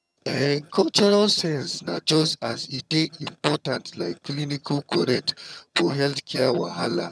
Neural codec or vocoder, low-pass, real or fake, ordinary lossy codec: vocoder, 22.05 kHz, 80 mel bands, HiFi-GAN; none; fake; none